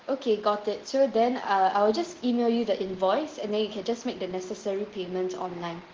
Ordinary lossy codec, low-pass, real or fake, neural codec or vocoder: Opus, 16 kbps; 7.2 kHz; real; none